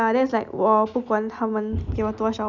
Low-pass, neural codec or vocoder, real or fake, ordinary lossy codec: 7.2 kHz; none; real; none